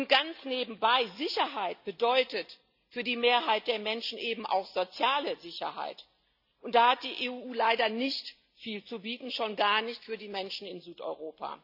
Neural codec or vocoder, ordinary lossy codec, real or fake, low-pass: none; none; real; 5.4 kHz